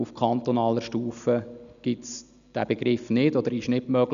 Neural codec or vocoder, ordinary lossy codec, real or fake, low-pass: none; none; real; 7.2 kHz